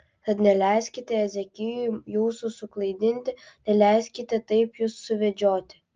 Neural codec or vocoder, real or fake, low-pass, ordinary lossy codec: none; real; 7.2 kHz; Opus, 24 kbps